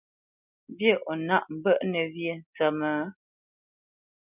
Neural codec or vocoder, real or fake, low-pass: none; real; 3.6 kHz